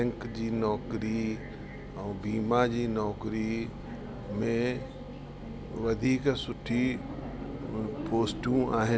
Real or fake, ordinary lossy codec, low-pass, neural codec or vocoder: real; none; none; none